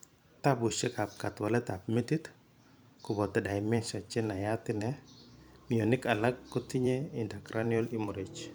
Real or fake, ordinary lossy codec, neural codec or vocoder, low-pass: fake; none; vocoder, 44.1 kHz, 128 mel bands every 512 samples, BigVGAN v2; none